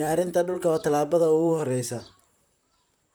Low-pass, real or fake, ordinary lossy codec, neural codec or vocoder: none; fake; none; vocoder, 44.1 kHz, 128 mel bands, Pupu-Vocoder